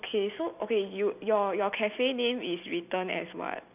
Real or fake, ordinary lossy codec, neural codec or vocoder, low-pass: real; none; none; 3.6 kHz